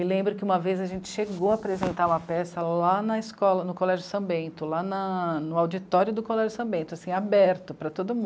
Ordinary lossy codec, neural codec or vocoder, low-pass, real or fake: none; none; none; real